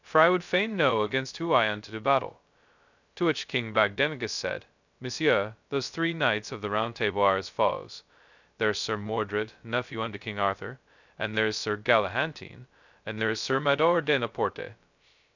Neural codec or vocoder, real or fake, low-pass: codec, 16 kHz, 0.2 kbps, FocalCodec; fake; 7.2 kHz